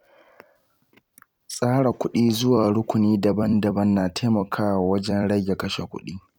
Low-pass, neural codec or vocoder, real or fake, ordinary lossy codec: 19.8 kHz; vocoder, 44.1 kHz, 128 mel bands every 256 samples, BigVGAN v2; fake; none